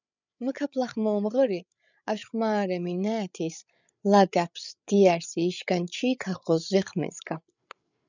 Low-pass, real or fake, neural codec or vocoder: 7.2 kHz; fake; codec, 16 kHz, 8 kbps, FreqCodec, larger model